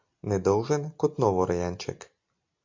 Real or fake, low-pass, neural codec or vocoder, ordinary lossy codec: real; 7.2 kHz; none; MP3, 48 kbps